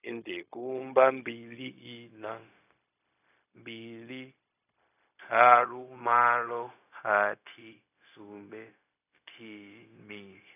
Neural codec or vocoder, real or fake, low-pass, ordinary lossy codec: codec, 16 kHz, 0.4 kbps, LongCat-Audio-Codec; fake; 3.6 kHz; none